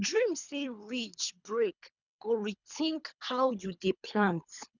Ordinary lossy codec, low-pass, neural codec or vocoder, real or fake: none; 7.2 kHz; codec, 24 kHz, 3 kbps, HILCodec; fake